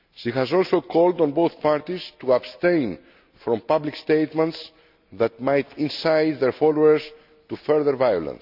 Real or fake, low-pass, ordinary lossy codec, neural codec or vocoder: real; 5.4 kHz; none; none